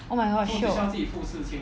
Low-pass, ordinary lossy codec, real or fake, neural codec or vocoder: none; none; real; none